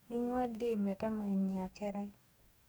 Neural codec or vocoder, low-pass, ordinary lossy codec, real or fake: codec, 44.1 kHz, 2.6 kbps, DAC; none; none; fake